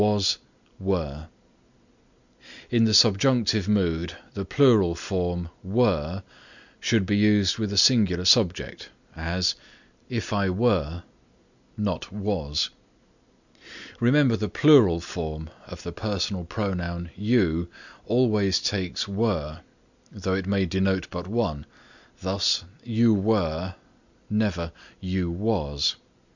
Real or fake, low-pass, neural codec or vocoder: real; 7.2 kHz; none